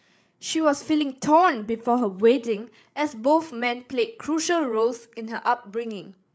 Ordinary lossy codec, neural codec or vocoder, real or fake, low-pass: none; codec, 16 kHz, 8 kbps, FreqCodec, larger model; fake; none